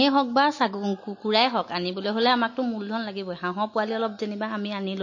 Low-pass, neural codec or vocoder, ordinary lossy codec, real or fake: 7.2 kHz; none; MP3, 32 kbps; real